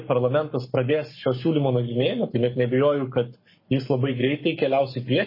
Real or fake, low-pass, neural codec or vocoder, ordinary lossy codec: fake; 5.4 kHz; codec, 44.1 kHz, 7.8 kbps, Pupu-Codec; MP3, 24 kbps